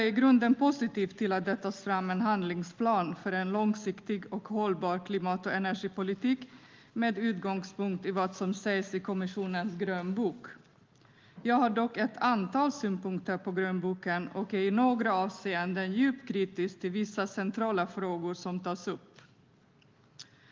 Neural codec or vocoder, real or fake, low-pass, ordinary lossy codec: none; real; 7.2 kHz; Opus, 32 kbps